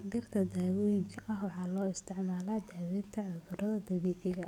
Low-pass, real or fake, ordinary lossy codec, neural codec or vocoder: 19.8 kHz; fake; none; codec, 44.1 kHz, 7.8 kbps, DAC